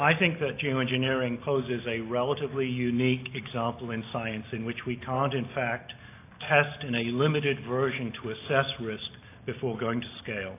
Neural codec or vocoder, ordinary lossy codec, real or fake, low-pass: none; AAC, 24 kbps; real; 3.6 kHz